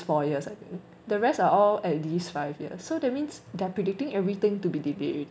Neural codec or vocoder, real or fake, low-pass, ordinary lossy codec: none; real; none; none